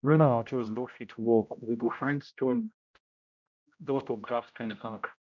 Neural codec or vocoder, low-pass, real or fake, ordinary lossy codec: codec, 16 kHz, 0.5 kbps, X-Codec, HuBERT features, trained on general audio; 7.2 kHz; fake; none